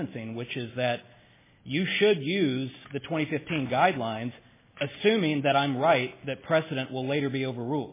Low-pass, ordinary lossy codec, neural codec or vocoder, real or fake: 3.6 kHz; MP3, 16 kbps; none; real